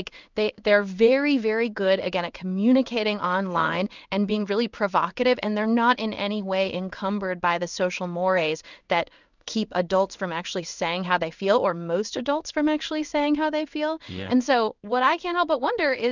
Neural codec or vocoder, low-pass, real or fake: codec, 16 kHz in and 24 kHz out, 1 kbps, XY-Tokenizer; 7.2 kHz; fake